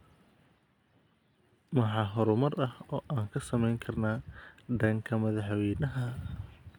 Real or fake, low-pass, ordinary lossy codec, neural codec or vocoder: real; 19.8 kHz; none; none